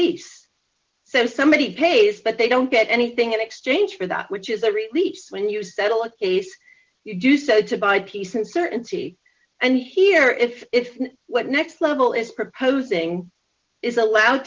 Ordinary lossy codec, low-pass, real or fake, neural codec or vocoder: Opus, 16 kbps; 7.2 kHz; real; none